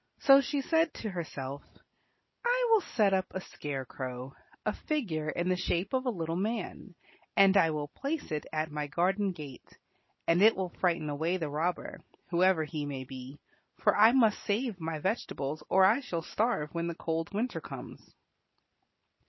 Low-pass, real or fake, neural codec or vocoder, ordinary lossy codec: 7.2 kHz; real; none; MP3, 24 kbps